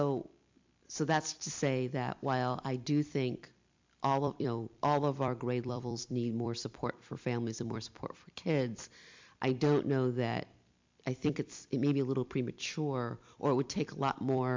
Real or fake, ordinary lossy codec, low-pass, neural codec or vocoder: fake; MP3, 64 kbps; 7.2 kHz; vocoder, 44.1 kHz, 128 mel bands every 256 samples, BigVGAN v2